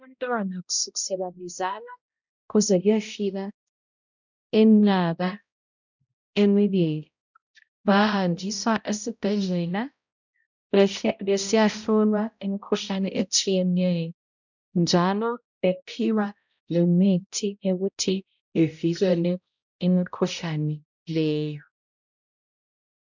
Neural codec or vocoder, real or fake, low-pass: codec, 16 kHz, 0.5 kbps, X-Codec, HuBERT features, trained on balanced general audio; fake; 7.2 kHz